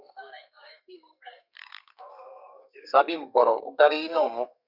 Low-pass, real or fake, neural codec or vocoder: 5.4 kHz; fake; codec, 32 kHz, 1.9 kbps, SNAC